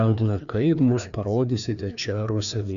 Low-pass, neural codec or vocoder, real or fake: 7.2 kHz; codec, 16 kHz, 2 kbps, FreqCodec, larger model; fake